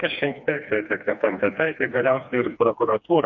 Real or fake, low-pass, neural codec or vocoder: fake; 7.2 kHz; codec, 16 kHz, 2 kbps, FreqCodec, smaller model